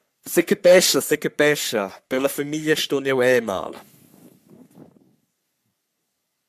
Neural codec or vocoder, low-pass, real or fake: codec, 44.1 kHz, 3.4 kbps, Pupu-Codec; 14.4 kHz; fake